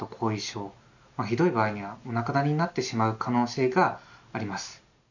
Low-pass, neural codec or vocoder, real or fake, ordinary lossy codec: 7.2 kHz; none; real; none